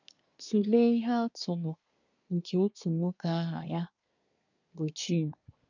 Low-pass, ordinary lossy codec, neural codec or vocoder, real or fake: 7.2 kHz; none; codec, 24 kHz, 1 kbps, SNAC; fake